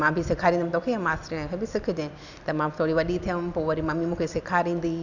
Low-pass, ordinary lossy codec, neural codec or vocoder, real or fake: 7.2 kHz; none; none; real